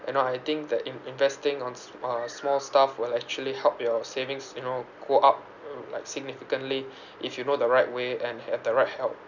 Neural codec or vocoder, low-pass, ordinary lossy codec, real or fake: none; 7.2 kHz; none; real